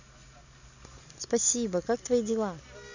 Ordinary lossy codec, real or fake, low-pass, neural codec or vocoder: none; real; 7.2 kHz; none